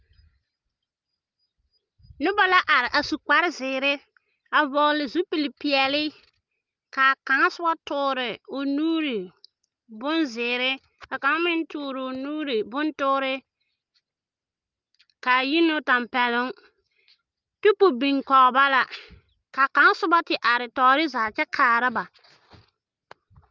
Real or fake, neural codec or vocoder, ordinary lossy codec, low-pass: real; none; Opus, 24 kbps; 7.2 kHz